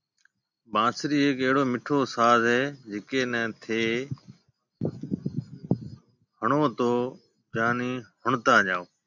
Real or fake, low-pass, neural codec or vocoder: real; 7.2 kHz; none